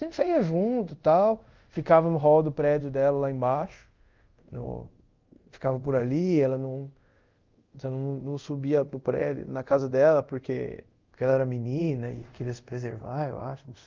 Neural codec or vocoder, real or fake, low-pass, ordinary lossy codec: codec, 24 kHz, 0.5 kbps, DualCodec; fake; 7.2 kHz; Opus, 32 kbps